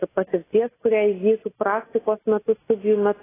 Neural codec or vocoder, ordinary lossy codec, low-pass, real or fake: none; AAC, 16 kbps; 3.6 kHz; real